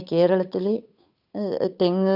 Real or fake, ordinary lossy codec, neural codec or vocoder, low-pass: fake; none; codec, 16 kHz, 8 kbps, FunCodec, trained on Chinese and English, 25 frames a second; 5.4 kHz